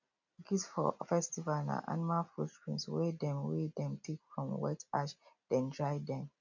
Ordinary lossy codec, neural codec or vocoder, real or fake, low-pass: none; none; real; 7.2 kHz